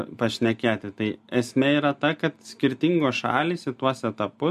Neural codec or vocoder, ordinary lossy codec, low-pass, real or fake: none; MP3, 64 kbps; 14.4 kHz; real